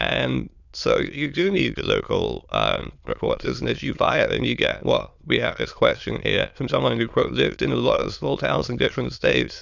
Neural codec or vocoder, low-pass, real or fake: autoencoder, 22.05 kHz, a latent of 192 numbers a frame, VITS, trained on many speakers; 7.2 kHz; fake